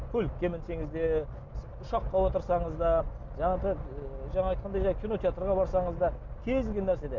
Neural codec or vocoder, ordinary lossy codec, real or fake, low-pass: none; MP3, 64 kbps; real; 7.2 kHz